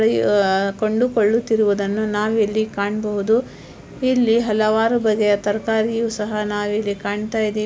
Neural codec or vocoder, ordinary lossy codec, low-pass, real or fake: none; none; none; real